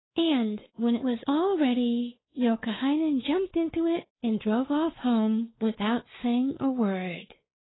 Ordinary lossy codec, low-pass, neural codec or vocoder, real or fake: AAC, 16 kbps; 7.2 kHz; codec, 16 kHz, 4 kbps, X-Codec, WavLM features, trained on Multilingual LibriSpeech; fake